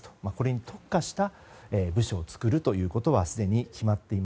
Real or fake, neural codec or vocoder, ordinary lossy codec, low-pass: real; none; none; none